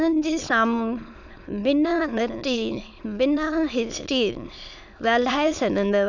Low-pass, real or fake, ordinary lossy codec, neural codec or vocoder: 7.2 kHz; fake; none; autoencoder, 22.05 kHz, a latent of 192 numbers a frame, VITS, trained on many speakers